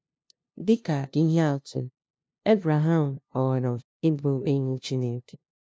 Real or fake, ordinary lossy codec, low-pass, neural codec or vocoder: fake; none; none; codec, 16 kHz, 0.5 kbps, FunCodec, trained on LibriTTS, 25 frames a second